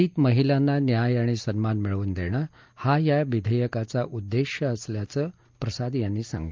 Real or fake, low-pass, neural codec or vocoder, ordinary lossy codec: real; 7.2 kHz; none; Opus, 32 kbps